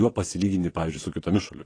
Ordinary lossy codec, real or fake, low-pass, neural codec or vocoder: AAC, 32 kbps; fake; 9.9 kHz; vocoder, 22.05 kHz, 80 mel bands, WaveNeXt